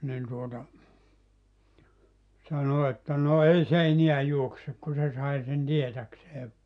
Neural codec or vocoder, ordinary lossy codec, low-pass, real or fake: none; none; 9.9 kHz; real